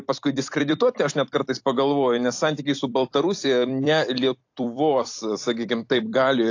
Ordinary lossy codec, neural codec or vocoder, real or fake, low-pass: AAC, 48 kbps; vocoder, 44.1 kHz, 128 mel bands every 256 samples, BigVGAN v2; fake; 7.2 kHz